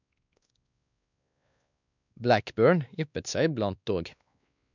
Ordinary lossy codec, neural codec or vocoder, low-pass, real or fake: none; codec, 16 kHz, 2 kbps, X-Codec, WavLM features, trained on Multilingual LibriSpeech; 7.2 kHz; fake